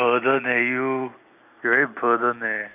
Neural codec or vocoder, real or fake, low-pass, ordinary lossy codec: none; real; 3.6 kHz; none